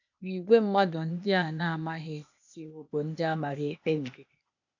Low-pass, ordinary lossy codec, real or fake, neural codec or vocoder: 7.2 kHz; none; fake; codec, 16 kHz, 0.8 kbps, ZipCodec